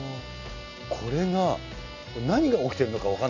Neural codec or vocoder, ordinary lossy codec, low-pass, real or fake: none; none; 7.2 kHz; real